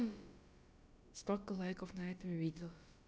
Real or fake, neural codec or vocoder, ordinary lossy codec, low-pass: fake; codec, 16 kHz, about 1 kbps, DyCAST, with the encoder's durations; none; none